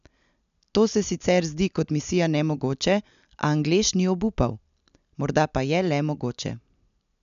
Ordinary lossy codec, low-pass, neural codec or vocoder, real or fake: none; 7.2 kHz; none; real